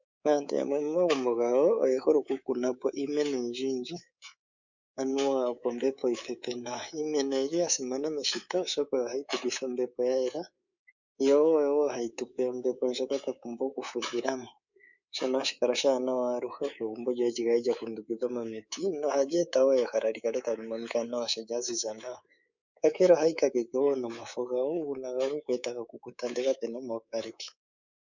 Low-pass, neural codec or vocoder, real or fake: 7.2 kHz; codec, 24 kHz, 3.1 kbps, DualCodec; fake